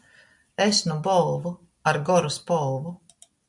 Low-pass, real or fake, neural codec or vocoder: 10.8 kHz; real; none